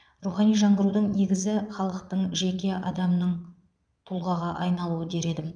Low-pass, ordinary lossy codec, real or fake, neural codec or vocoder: 9.9 kHz; none; fake; vocoder, 22.05 kHz, 80 mel bands, Vocos